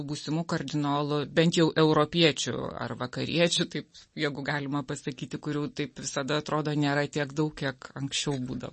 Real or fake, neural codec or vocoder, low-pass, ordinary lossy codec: real; none; 10.8 kHz; MP3, 32 kbps